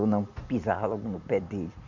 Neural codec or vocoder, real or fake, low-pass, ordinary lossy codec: none; real; 7.2 kHz; none